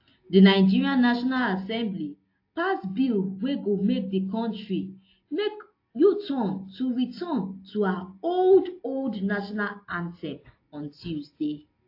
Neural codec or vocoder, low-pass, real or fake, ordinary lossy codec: none; 5.4 kHz; real; AAC, 32 kbps